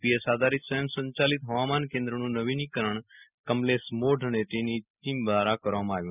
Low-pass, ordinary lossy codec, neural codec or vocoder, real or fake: 3.6 kHz; none; none; real